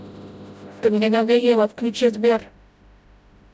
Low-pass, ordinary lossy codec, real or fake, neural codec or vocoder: none; none; fake; codec, 16 kHz, 0.5 kbps, FreqCodec, smaller model